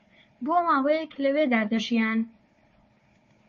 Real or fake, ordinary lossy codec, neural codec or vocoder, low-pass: fake; MP3, 32 kbps; codec, 16 kHz, 4 kbps, FunCodec, trained on Chinese and English, 50 frames a second; 7.2 kHz